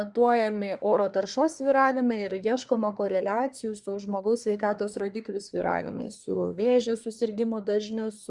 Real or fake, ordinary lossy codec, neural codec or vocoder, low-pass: fake; Opus, 64 kbps; codec, 24 kHz, 1 kbps, SNAC; 10.8 kHz